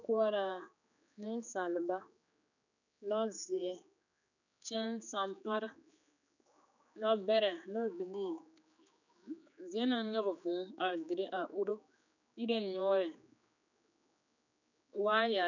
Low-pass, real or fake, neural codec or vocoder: 7.2 kHz; fake; codec, 16 kHz, 4 kbps, X-Codec, HuBERT features, trained on general audio